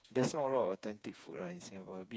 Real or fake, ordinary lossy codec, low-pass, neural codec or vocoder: fake; none; none; codec, 16 kHz, 4 kbps, FreqCodec, smaller model